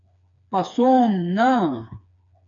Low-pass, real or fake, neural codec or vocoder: 7.2 kHz; fake; codec, 16 kHz, 4 kbps, FreqCodec, smaller model